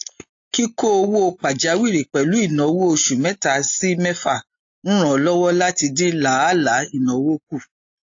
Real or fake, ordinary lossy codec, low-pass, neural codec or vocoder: real; AAC, 48 kbps; 7.2 kHz; none